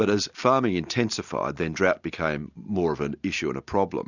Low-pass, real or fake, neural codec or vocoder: 7.2 kHz; real; none